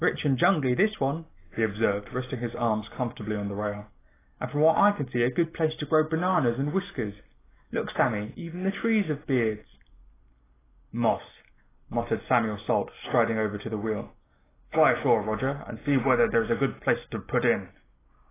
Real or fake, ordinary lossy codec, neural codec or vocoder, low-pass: real; AAC, 16 kbps; none; 3.6 kHz